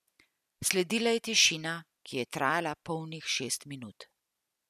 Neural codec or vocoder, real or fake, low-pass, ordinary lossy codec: none; real; 14.4 kHz; none